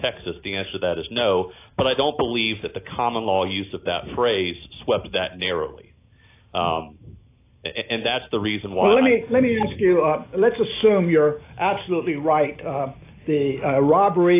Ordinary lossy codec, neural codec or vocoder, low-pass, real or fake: AAC, 24 kbps; none; 3.6 kHz; real